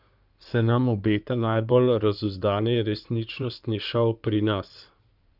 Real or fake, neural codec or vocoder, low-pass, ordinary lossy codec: fake; codec, 16 kHz in and 24 kHz out, 2.2 kbps, FireRedTTS-2 codec; 5.4 kHz; none